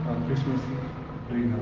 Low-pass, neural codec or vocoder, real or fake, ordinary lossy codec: 7.2 kHz; none; real; Opus, 16 kbps